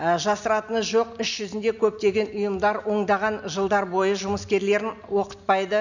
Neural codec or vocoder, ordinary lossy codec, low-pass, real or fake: none; none; 7.2 kHz; real